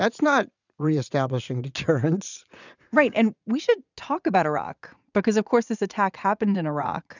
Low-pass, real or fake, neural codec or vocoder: 7.2 kHz; fake; vocoder, 44.1 kHz, 80 mel bands, Vocos